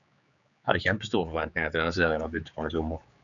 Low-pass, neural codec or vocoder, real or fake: 7.2 kHz; codec, 16 kHz, 4 kbps, X-Codec, HuBERT features, trained on general audio; fake